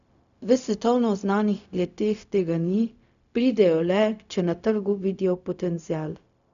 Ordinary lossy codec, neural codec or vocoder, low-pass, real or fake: none; codec, 16 kHz, 0.4 kbps, LongCat-Audio-Codec; 7.2 kHz; fake